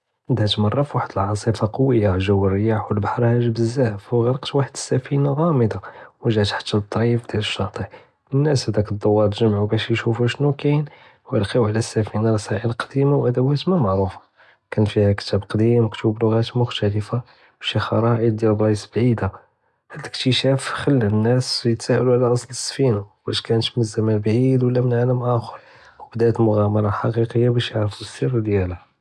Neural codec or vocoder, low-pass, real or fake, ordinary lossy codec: none; none; real; none